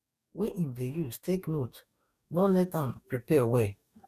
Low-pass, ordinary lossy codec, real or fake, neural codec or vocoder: 14.4 kHz; none; fake; codec, 44.1 kHz, 2.6 kbps, DAC